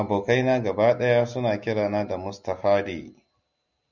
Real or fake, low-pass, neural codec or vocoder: real; 7.2 kHz; none